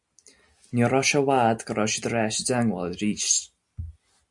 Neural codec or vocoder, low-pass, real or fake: none; 10.8 kHz; real